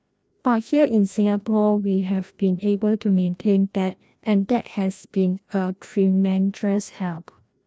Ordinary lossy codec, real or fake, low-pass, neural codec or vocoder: none; fake; none; codec, 16 kHz, 1 kbps, FreqCodec, larger model